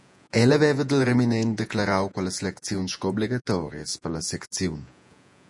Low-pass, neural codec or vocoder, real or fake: 10.8 kHz; vocoder, 48 kHz, 128 mel bands, Vocos; fake